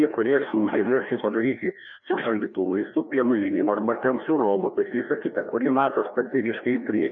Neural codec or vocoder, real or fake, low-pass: codec, 16 kHz, 1 kbps, FreqCodec, larger model; fake; 7.2 kHz